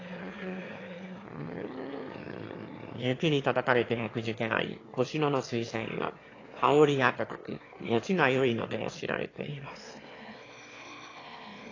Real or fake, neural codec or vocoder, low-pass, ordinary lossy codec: fake; autoencoder, 22.05 kHz, a latent of 192 numbers a frame, VITS, trained on one speaker; 7.2 kHz; AAC, 32 kbps